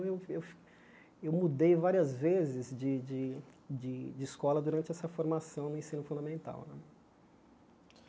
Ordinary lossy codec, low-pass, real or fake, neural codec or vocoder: none; none; real; none